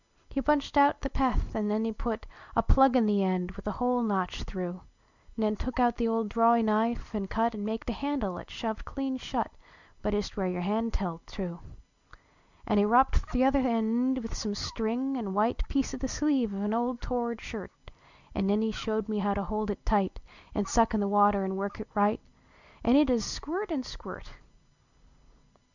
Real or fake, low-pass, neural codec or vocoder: real; 7.2 kHz; none